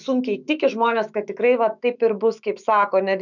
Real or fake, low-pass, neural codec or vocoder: fake; 7.2 kHz; autoencoder, 48 kHz, 128 numbers a frame, DAC-VAE, trained on Japanese speech